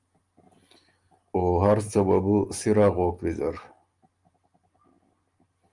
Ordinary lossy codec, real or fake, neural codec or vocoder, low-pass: Opus, 32 kbps; real; none; 10.8 kHz